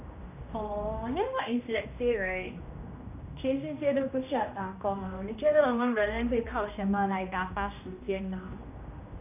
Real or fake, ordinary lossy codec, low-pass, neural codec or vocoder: fake; none; 3.6 kHz; codec, 16 kHz, 1 kbps, X-Codec, HuBERT features, trained on general audio